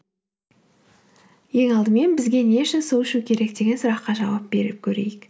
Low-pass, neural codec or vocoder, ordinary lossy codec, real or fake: none; none; none; real